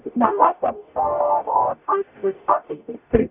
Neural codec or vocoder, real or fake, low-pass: codec, 44.1 kHz, 0.9 kbps, DAC; fake; 3.6 kHz